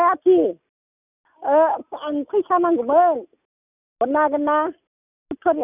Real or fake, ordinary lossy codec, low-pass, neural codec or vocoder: real; none; 3.6 kHz; none